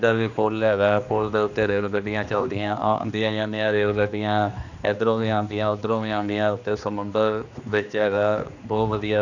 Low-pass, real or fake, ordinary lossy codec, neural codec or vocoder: 7.2 kHz; fake; none; codec, 16 kHz, 2 kbps, X-Codec, HuBERT features, trained on general audio